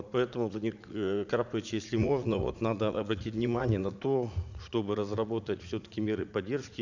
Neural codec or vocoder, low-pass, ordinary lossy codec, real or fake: vocoder, 44.1 kHz, 80 mel bands, Vocos; 7.2 kHz; none; fake